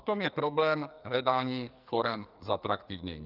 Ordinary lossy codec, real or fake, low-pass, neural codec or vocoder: Opus, 24 kbps; fake; 5.4 kHz; codec, 44.1 kHz, 2.6 kbps, SNAC